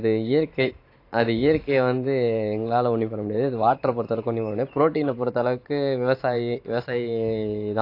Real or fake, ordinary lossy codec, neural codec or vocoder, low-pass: fake; AAC, 32 kbps; vocoder, 44.1 kHz, 128 mel bands every 512 samples, BigVGAN v2; 5.4 kHz